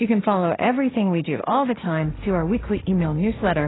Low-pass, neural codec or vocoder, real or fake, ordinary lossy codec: 7.2 kHz; codec, 16 kHz, 1.1 kbps, Voila-Tokenizer; fake; AAC, 16 kbps